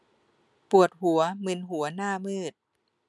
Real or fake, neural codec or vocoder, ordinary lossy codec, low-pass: real; none; none; none